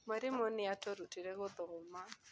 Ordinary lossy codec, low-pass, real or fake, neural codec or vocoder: none; none; real; none